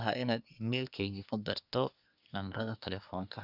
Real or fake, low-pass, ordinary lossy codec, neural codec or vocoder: fake; 5.4 kHz; none; codec, 24 kHz, 1 kbps, SNAC